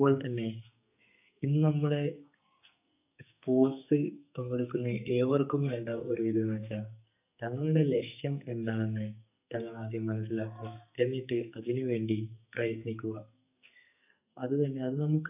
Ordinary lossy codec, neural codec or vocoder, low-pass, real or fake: none; codec, 44.1 kHz, 2.6 kbps, SNAC; 3.6 kHz; fake